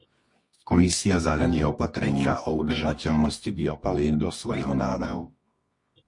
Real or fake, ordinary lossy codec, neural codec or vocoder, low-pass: fake; MP3, 48 kbps; codec, 24 kHz, 0.9 kbps, WavTokenizer, medium music audio release; 10.8 kHz